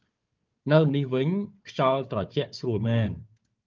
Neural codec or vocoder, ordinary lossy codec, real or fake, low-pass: codec, 16 kHz, 4 kbps, FunCodec, trained on Chinese and English, 50 frames a second; Opus, 24 kbps; fake; 7.2 kHz